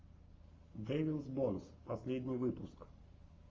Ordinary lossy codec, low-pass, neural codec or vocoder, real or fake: Opus, 32 kbps; 7.2 kHz; codec, 44.1 kHz, 7.8 kbps, Pupu-Codec; fake